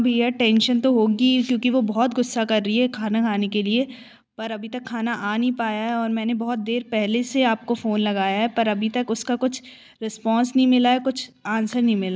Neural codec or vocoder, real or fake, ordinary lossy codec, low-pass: none; real; none; none